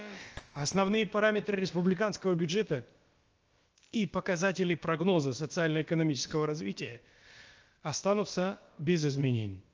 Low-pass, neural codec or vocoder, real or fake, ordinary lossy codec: 7.2 kHz; codec, 16 kHz, about 1 kbps, DyCAST, with the encoder's durations; fake; Opus, 24 kbps